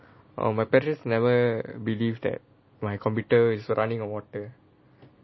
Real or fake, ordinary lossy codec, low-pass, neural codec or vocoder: real; MP3, 24 kbps; 7.2 kHz; none